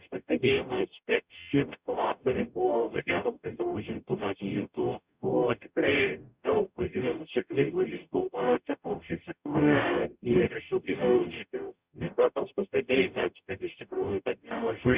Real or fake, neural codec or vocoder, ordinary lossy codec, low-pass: fake; codec, 44.1 kHz, 0.9 kbps, DAC; Opus, 64 kbps; 3.6 kHz